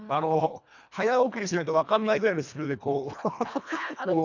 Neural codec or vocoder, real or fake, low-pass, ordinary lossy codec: codec, 24 kHz, 1.5 kbps, HILCodec; fake; 7.2 kHz; none